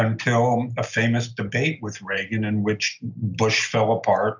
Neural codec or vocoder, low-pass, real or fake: none; 7.2 kHz; real